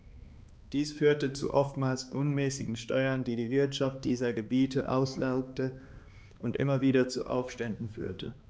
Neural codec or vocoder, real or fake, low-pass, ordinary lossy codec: codec, 16 kHz, 2 kbps, X-Codec, HuBERT features, trained on balanced general audio; fake; none; none